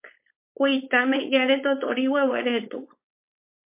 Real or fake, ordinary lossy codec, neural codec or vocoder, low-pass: fake; MP3, 24 kbps; codec, 16 kHz, 4.8 kbps, FACodec; 3.6 kHz